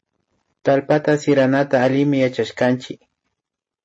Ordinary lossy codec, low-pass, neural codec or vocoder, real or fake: MP3, 32 kbps; 9.9 kHz; none; real